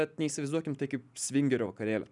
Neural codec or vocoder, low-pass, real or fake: none; 10.8 kHz; real